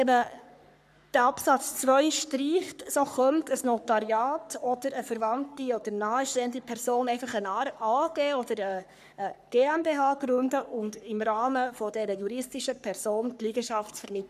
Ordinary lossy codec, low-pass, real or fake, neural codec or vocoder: none; 14.4 kHz; fake; codec, 44.1 kHz, 3.4 kbps, Pupu-Codec